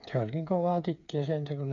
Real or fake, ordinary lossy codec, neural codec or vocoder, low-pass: fake; MP3, 96 kbps; codec, 16 kHz, 8 kbps, FreqCodec, smaller model; 7.2 kHz